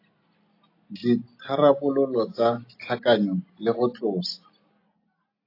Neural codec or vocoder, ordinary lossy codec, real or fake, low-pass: none; AAC, 32 kbps; real; 5.4 kHz